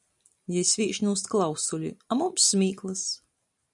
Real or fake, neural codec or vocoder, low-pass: real; none; 10.8 kHz